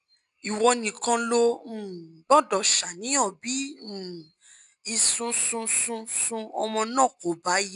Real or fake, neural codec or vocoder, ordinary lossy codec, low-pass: real; none; AAC, 96 kbps; 10.8 kHz